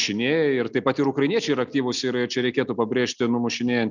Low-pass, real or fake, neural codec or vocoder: 7.2 kHz; real; none